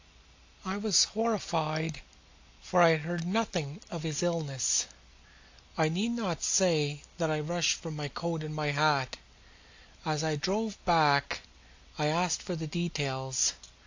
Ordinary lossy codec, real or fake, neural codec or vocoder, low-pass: AAC, 48 kbps; real; none; 7.2 kHz